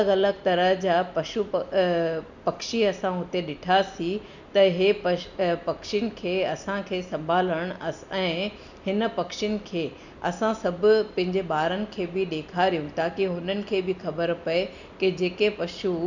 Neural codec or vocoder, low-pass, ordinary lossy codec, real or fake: none; 7.2 kHz; none; real